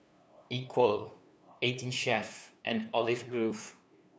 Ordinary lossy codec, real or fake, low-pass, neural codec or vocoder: none; fake; none; codec, 16 kHz, 2 kbps, FunCodec, trained on LibriTTS, 25 frames a second